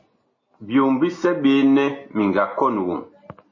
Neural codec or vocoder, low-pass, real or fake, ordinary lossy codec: none; 7.2 kHz; real; MP3, 32 kbps